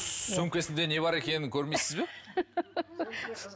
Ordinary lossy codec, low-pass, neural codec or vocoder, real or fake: none; none; none; real